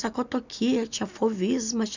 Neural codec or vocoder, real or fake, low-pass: none; real; 7.2 kHz